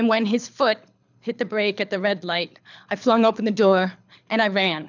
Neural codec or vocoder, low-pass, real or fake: codec, 24 kHz, 6 kbps, HILCodec; 7.2 kHz; fake